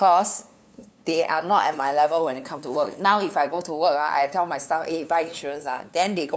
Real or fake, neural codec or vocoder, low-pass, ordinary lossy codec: fake; codec, 16 kHz, 2 kbps, FunCodec, trained on LibriTTS, 25 frames a second; none; none